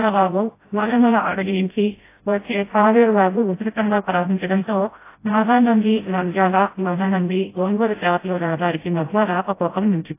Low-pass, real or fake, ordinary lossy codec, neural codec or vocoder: 3.6 kHz; fake; AAC, 24 kbps; codec, 16 kHz, 0.5 kbps, FreqCodec, smaller model